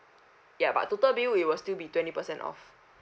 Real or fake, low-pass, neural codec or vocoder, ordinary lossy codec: real; none; none; none